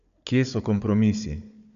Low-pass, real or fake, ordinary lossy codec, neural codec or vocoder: 7.2 kHz; fake; none; codec, 16 kHz, 4 kbps, FunCodec, trained on Chinese and English, 50 frames a second